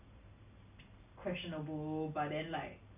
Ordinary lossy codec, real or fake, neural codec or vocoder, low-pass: none; real; none; 3.6 kHz